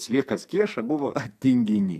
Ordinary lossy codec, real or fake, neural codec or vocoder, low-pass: MP3, 96 kbps; fake; codec, 44.1 kHz, 2.6 kbps, SNAC; 14.4 kHz